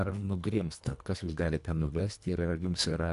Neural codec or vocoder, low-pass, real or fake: codec, 24 kHz, 1.5 kbps, HILCodec; 10.8 kHz; fake